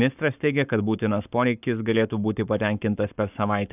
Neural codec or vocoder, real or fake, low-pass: codec, 44.1 kHz, 7.8 kbps, Pupu-Codec; fake; 3.6 kHz